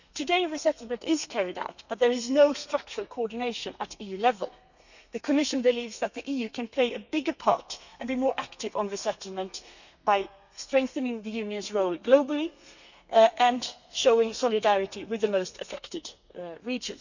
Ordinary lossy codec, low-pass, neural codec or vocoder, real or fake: none; 7.2 kHz; codec, 32 kHz, 1.9 kbps, SNAC; fake